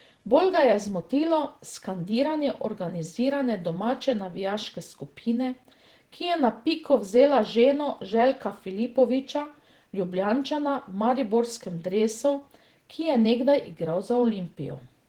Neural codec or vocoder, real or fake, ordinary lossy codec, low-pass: vocoder, 48 kHz, 128 mel bands, Vocos; fake; Opus, 16 kbps; 19.8 kHz